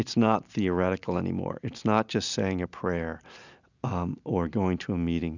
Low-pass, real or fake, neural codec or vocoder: 7.2 kHz; real; none